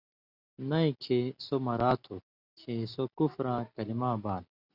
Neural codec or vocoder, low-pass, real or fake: none; 5.4 kHz; real